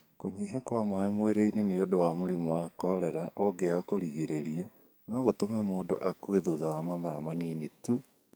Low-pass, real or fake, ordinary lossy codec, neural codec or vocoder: none; fake; none; codec, 44.1 kHz, 2.6 kbps, SNAC